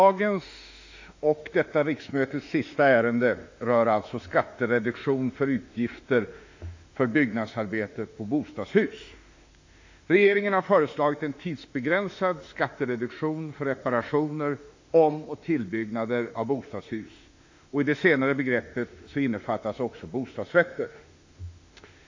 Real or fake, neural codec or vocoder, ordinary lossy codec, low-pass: fake; autoencoder, 48 kHz, 32 numbers a frame, DAC-VAE, trained on Japanese speech; AAC, 48 kbps; 7.2 kHz